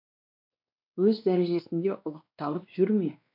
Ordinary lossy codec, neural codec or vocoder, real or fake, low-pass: AAC, 48 kbps; codec, 16 kHz, 2 kbps, X-Codec, WavLM features, trained on Multilingual LibriSpeech; fake; 5.4 kHz